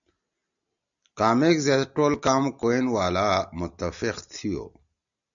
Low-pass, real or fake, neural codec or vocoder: 7.2 kHz; real; none